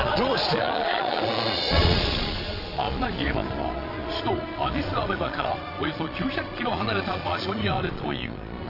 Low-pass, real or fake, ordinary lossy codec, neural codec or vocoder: 5.4 kHz; fake; AAC, 32 kbps; vocoder, 22.05 kHz, 80 mel bands, Vocos